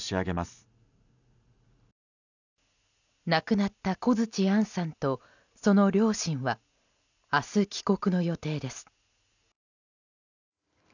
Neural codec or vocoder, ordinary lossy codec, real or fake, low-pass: none; none; real; 7.2 kHz